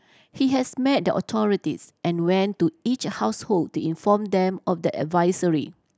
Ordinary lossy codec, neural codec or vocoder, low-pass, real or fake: none; none; none; real